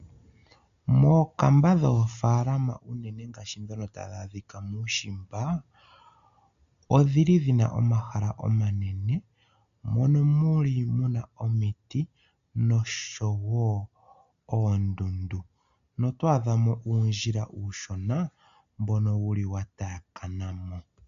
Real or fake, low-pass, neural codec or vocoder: real; 7.2 kHz; none